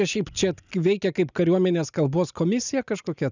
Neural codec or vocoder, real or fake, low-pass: none; real; 7.2 kHz